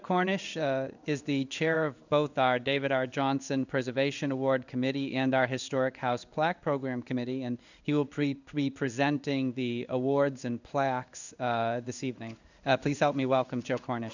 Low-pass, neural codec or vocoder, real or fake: 7.2 kHz; codec, 16 kHz in and 24 kHz out, 1 kbps, XY-Tokenizer; fake